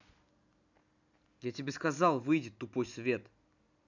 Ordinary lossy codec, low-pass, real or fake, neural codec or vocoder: none; 7.2 kHz; real; none